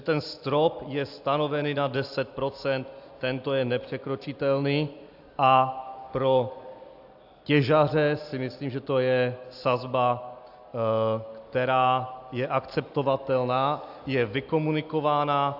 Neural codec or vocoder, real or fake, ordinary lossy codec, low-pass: none; real; AAC, 48 kbps; 5.4 kHz